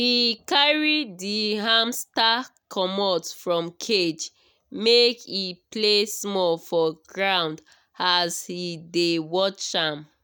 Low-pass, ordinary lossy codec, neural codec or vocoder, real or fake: none; none; none; real